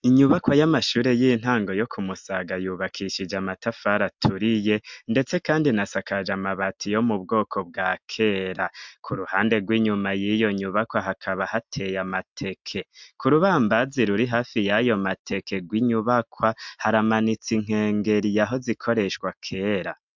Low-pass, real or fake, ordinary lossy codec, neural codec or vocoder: 7.2 kHz; real; MP3, 64 kbps; none